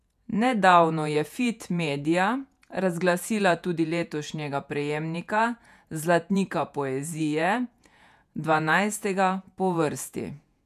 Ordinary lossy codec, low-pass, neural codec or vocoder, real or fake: none; 14.4 kHz; vocoder, 48 kHz, 128 mel bands, Vocos; fake